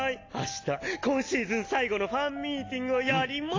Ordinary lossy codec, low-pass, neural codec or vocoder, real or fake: AAC, 32 kbps; 7.2 kHz; none; real